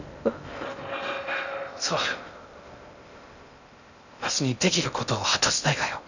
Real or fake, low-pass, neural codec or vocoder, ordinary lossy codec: fake; 7.2 kHz; codec, 16 kHz in and 24 kHz out, 0.6 kbps, FocalCodec, streaming, 2048 codes; none